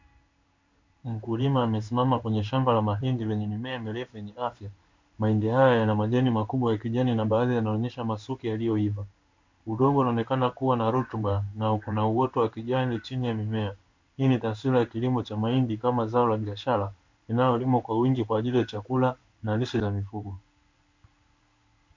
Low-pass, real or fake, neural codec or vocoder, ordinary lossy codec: 7.2 kHz; fake; codec, 16 kHz in and 24 kHz out, 1 kbps, XY-Tokenizer; MP3, 64 kbps